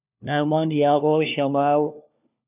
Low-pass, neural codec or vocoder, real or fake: 3.6 kHz; codec, 16 kHz, 1 kbps, FunCodec, trained on LibriTTS, 50 frames a second; fake